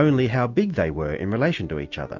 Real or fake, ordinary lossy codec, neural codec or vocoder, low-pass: real; MP3, 48 kbps; none; 7.2 kHz